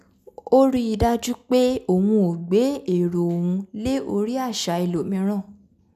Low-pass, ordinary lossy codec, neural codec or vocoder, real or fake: 14.4 kHz; none; none; real